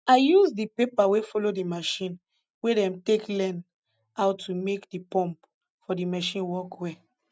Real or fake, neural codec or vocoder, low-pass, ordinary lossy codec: real; none; none; none